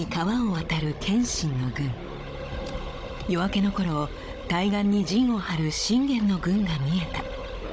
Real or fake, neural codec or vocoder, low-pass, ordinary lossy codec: fake; codec, 16 kHz, 16 kbps, FunCodec, trained on Chinese and English, 50 frames a second; none; none